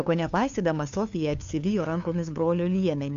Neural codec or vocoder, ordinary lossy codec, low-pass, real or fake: codec, 16 kHz, 2 kbps, FunCodec, trained on LibriTTS, 25 frames a second; AAC, 64 kbps; 7.2 kHz; fake